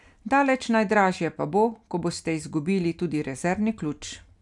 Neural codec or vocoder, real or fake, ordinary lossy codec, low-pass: none; real; none; 10.8 kHz